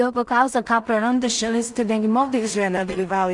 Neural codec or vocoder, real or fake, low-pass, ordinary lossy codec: codec, 16 kHz in and 24 kHz out, 0.4 kbps, LongCat-Audio-Codec, two codebook decoder; fake; 10.8 kHz; Opus, 64 kbps